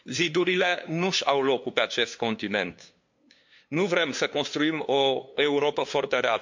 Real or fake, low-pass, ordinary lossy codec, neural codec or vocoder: fake; 7.2 kHz; MP3, 48 kbps; codec, 16 kHz, 2 kbps, FunCodec, trained on LibriTTS, 25 frames a second